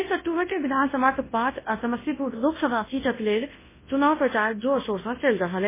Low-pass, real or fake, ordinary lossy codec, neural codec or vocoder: 3.6 kHz; fake; MP3, 16 kbps; codec, 24 kHz, 0.9 kbps, WavTokenizer, large speech release